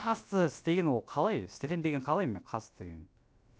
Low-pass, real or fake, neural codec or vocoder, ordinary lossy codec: none; fake; codec, 16 kHz, about 1 kbps, DyCAST, with the encoder's durations; none